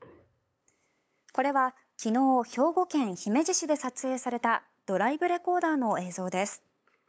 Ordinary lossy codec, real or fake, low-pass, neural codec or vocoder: none; fake; none; codec, 16 kHz, 8 kbps, FunCodec, trained on LibriTTS, 25 frames a second